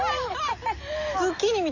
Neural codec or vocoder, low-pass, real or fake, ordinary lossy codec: none; 7.2 kHz; real; none